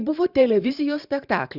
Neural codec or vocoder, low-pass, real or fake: vocoder, 44.1 kHz, 128 mel bands every 512 samples, BigVGAN v2; 5.4 kHz; fake